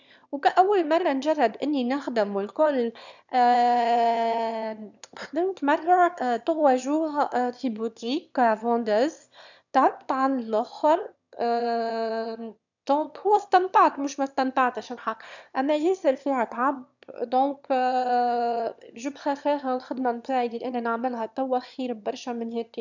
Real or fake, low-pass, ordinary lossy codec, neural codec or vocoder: fake; 7.2 kHz; none; autoencoder, 22.05 kHz, a latent of 192 numbers a frame, VITS, trained on one speaker